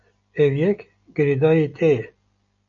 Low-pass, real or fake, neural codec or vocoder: 7.2 kHz; real; none